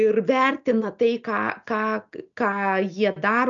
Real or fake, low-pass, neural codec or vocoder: real; 7.2 kHz; none